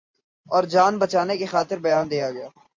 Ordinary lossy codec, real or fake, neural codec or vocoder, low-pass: MP3, 48 kbps; real; none; 7.2 kHz